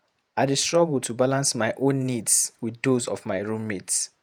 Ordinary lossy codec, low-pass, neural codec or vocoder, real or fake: none; none; vocoder, 48 kHz, 128 mel bands, Vocos; fake